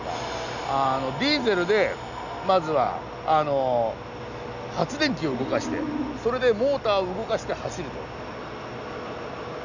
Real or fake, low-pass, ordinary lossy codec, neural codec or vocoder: real; 7.2 kHz; none; none